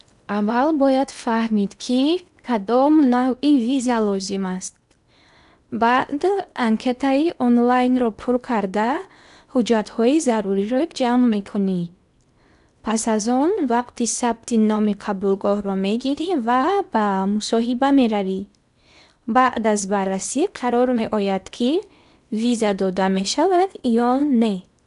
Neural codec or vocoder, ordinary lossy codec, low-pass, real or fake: codec, 16 kHz in and 24 kHz out, 0.8 kbps, FocalCodec, streaming, 65536 codes; none; 10.8 kHz; fake